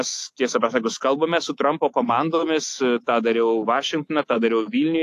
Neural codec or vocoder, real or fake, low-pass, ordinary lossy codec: autoencoder, 48 kHz, 128 numbers a frame, DAC-VAE, trained on Japanese speech; fake; 14.4 kHz; AAC, 64 kbps